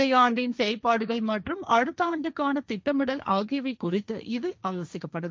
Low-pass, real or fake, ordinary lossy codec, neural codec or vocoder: none; fake; none; codec, 16 kHz, 1.1 kbps, Voila-Tokenizer